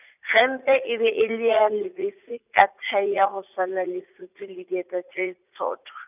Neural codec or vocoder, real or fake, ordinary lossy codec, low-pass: vocoder, 44.1 kHz, 80 mel bands, Vocos; fake; none; 3.6 kHz